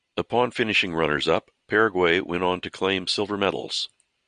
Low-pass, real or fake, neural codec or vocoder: 9.9 kHz; real; none